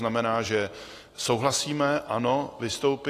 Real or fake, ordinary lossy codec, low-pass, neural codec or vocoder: real; AAC, 48 kbps; 14.4 kHz; none